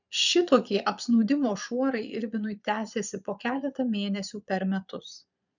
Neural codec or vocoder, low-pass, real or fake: vocoder, 22.05 kHz, 80 mel bands, Vocos; 7.2 kHz; fake